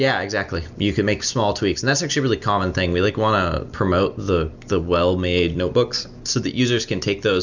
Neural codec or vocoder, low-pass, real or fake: none; 7.2 kHz; real